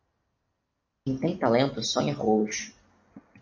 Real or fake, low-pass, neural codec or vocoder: real; 7.2 kHz; none